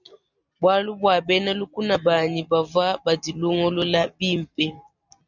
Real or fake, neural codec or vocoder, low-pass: real; none; 7.2 kHz